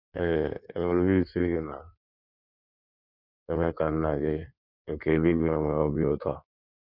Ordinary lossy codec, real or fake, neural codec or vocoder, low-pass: none; fake; codec, 16 kHz in and 24 kHz out, 1.1 kbps, FireRedTTS-2 codec; 5.4 kHz